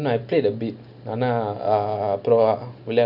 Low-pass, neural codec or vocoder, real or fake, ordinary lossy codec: 5.4 kHz; none; real; none